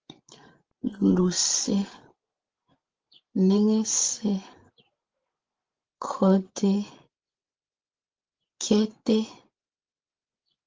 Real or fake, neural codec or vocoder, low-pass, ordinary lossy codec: fake; codec, 16 kHz, 16 kbps, FreqCodec, larger model; 7.2 kHz; Opus, 16 kbps